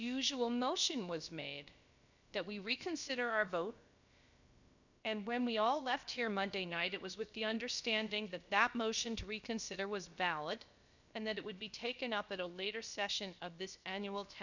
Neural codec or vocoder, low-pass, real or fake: codec, 16 kHz, about 1 kbps, DyCAST, with the encoder's durations; 7.2 kHz; fake